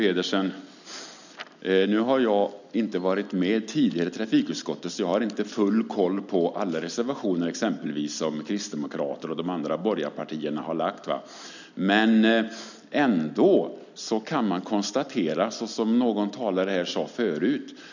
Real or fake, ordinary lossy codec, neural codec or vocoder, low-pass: real; none; none; 7.2 kHz